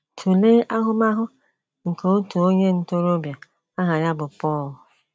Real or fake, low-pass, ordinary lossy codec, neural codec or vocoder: real; none; none; none